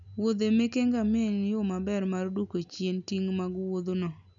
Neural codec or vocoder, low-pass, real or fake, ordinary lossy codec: none; 7.2 kHz; real; none